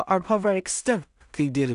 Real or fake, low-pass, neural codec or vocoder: fake; 10.8 kHz; codec, 16 kHz in and 24 kHz out, 0.4 kbps, LongCat-Audio-Codec, two codebook decoder